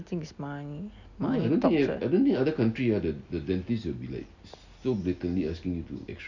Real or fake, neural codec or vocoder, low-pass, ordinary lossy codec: real; none; 7.2 kHz; none